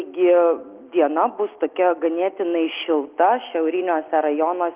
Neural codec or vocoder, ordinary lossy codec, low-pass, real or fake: none; Opus, 32 kbps; 3.6 kHz; real